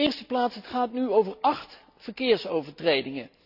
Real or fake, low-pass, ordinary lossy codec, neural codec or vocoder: real; 5.4 kHz; none; none